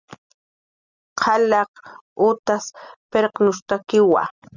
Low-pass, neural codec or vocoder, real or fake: 7.2 kHz; none; real